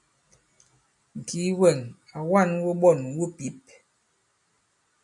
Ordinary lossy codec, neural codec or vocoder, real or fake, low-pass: MP3, 96 kbps; none; real; 10.8 kHz